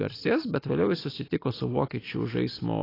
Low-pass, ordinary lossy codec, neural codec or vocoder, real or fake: 5.4 kHz; AAC, 24 kbps; none; real